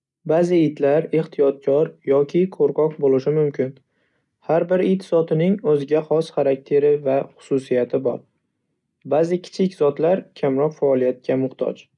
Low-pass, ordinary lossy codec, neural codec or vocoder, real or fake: 10.8 kHz; none; none; real